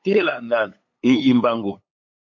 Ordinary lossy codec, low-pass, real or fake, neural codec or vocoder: MP3, 48 kbps; 7.2 kHz; fake; codec, 16 kHz, 8 kbps, FunCodec, trained on LibriTTS, 25 frames a second